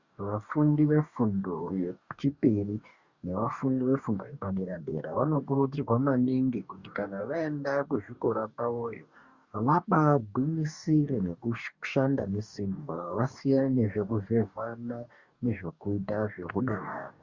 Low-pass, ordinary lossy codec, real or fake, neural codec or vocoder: 7.2 kHz; Opus, 64 kbps; fake; codec, 44.1 kHz, 2.6 kbps, DAC